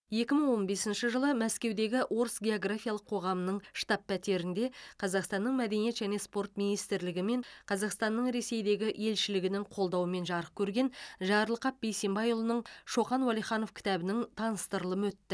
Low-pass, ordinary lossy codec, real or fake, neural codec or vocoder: none; none; real; none